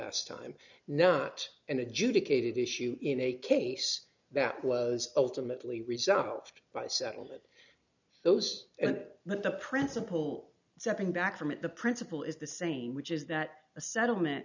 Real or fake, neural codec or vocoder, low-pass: real; none; 7.2 kHz